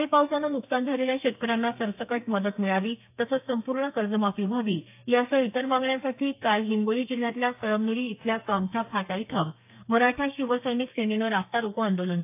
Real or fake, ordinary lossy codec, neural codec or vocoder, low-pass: fake; AAC, 32 kbps; codec, 32 kHz, 1.9 kbps, SNAC; 3.6 kHz